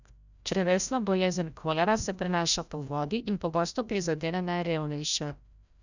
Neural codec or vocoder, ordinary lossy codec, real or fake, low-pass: codec, 16 kHz, 0.5 kbps, FreqCodec, larger model; none; fake; 7.2 kHz